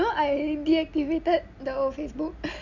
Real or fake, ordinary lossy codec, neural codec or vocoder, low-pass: real; none; none; 7.2 kHz